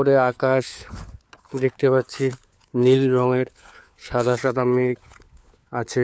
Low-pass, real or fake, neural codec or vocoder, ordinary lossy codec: none; fake; codec, 16 kHz, 4 kbps, FunCodec, trained on LibriTTS, 50 frames a second; none